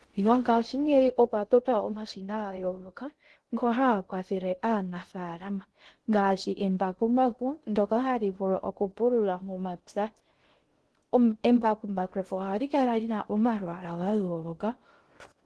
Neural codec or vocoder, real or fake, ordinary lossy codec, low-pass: codec, 16 kHz in and 24 kHz out, 0.6 kbps, FocalCodec, streaming, 2048 codes; fake; Opus, 16 kbps; 10.8 kHz